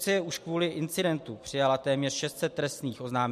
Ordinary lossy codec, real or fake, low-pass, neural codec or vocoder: MP3, 64 kbps; real; 14.4 kHz; none